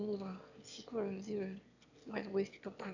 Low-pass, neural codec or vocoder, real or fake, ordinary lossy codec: 7.2 kHz; autoencoder, 22.05 kHz, a latent of 192 numbers a frame, VITS, trained on one speaker; fake; none